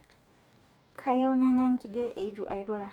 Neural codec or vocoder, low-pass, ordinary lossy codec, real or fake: codec, 44.1 kHz, 2.6 kbps, DAC; 19.8 kHz; none; fake